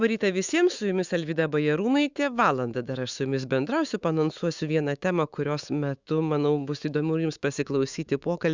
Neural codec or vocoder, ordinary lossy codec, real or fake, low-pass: autoencoder, 48 kHz, 128 numbers a frame, DAC-VAE, trained on Japanese speech; Opus, 64 kbps; fake; 7.2 kHz